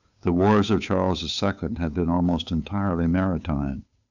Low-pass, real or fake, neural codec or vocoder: 7.2 kHz; fake; codec, 16 kHz, 8 kbps, FunCodec, trained on Chinese and English, 25 frames a second